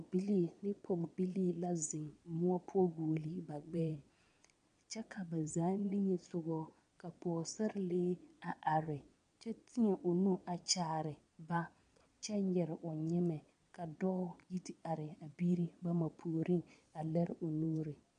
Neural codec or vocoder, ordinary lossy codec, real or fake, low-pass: vocoder, 22.05 kHz, 80 mel bands, Vocos; MP3, 64 kbps; fake; 9.9 kHz